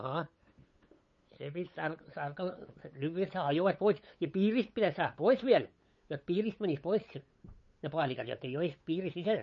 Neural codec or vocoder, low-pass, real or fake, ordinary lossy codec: codec, 16 kHz, 8 kbps, FunCodec, trained on LibriTTS, 25 frames a second; 7.2 kHz; fake; MP3, 32 kbps